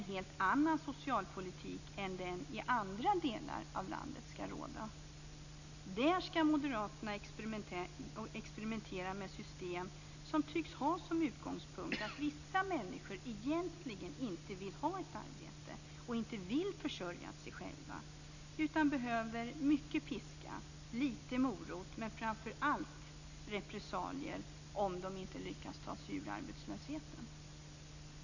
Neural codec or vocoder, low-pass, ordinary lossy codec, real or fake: none; 7.2 kHz; none; real